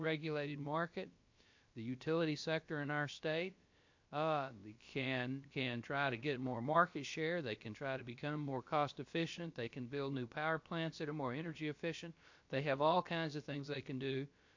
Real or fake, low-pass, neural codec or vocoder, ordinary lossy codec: fake; 7.2 kHz; codec, 16 kHz, about 1 kbps, DyCAST, with the encoder's durations; MP3, 48 kbps